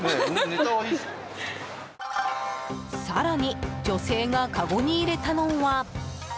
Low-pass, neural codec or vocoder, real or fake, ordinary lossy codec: none; none; real; none